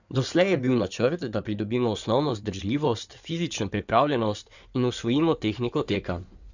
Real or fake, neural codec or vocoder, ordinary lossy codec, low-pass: fake; codec, 16 kHz in and 24 kHz out, 2.2 kbps, FireRedTTS-2 codec; none; 7.2 kHz